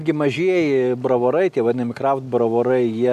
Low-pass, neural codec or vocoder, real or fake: 14.4 kHz; none; real